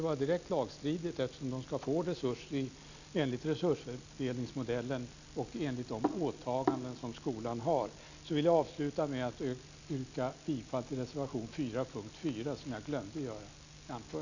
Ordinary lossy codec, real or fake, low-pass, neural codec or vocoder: none; real; 7.2 kHz; none